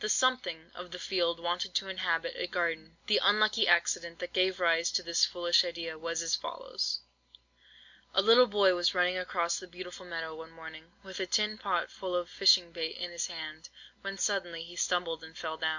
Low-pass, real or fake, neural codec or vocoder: 7.2 kHz; real; none